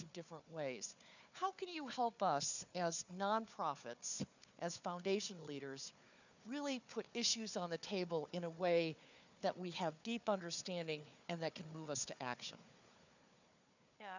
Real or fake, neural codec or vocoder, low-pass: fake; codec, 16 kHz, 4 kbps, FunCodec, trained on Chinese and English, 50 frames a second; 7.2 kHz